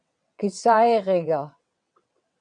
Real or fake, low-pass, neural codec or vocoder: fake; 9.9 kHz; vocoder, 22.05 kHz, 80 mel bands, WaveNeXt